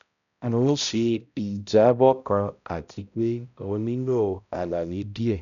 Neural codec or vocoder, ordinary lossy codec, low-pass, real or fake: codec, 16 kHz, 0.5 kbps, X-Codec, HuBERT features, trained on balanced general audio; none; 7.2 kHz; fake